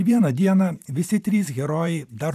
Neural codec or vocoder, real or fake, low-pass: vocoder, 44.1 kHz, 128 mel bands every 512 samples, BigVGAN v2; fake; 14.4 kHz